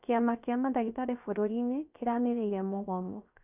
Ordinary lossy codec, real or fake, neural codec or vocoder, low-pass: none; fake; codec, 16 kHz, 0.7 kbps, FocalCodec; 3.6 kHz